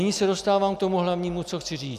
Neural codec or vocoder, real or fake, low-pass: none; real; 14.4 kHz